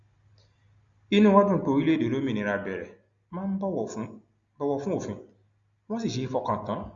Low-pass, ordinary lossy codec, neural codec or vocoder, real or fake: 7.2 kHz; Opus, 64 kbps; none; real